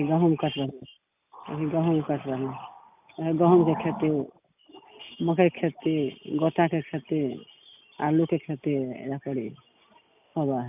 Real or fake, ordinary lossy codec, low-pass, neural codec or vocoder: real; none; 3.6 kHz; none